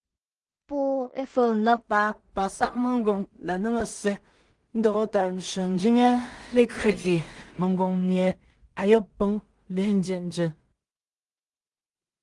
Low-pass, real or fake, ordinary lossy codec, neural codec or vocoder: 10.8 kHz; fake; Opus, 24 kbps; codec, 16 kHz in and 24 kHz out, 0.4 kbps, LongCat-Audio-Codec, two codebook decoder